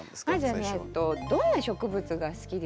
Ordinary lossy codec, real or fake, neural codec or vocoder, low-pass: none; real; none; none